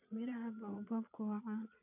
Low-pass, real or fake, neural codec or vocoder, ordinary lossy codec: 3.6 kHz; fake; vocoder, 22.05 kHz, 80 mel bands, Vocos; none